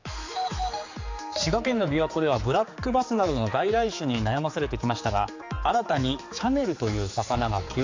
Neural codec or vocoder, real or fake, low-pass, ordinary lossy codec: codec, 16 kHz, 4 kbps, X-Codec, HuBERT features, trained on general audio; fake; 7.2 kHz; AAC, 48 kbps